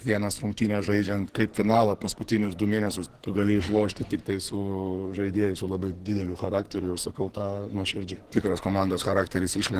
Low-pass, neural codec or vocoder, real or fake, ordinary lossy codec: 14.4 kHz; codec, 44.1 kHz, 2.6 kbps, SNAC; fake; Opus, 16 kbps